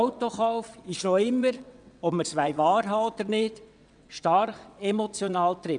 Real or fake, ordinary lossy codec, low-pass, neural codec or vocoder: fake; none; 9.9 kHz; vocoder, 22.05 kHz, 80 mel bands, WaveNeXt